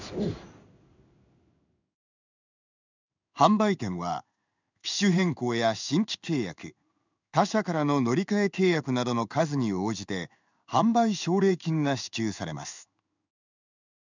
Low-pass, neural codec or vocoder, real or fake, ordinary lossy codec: 7.2 kHz; codec, 16 kHz in and 24 kHz out, 1 kbps, XY-Tokenizer; fake; none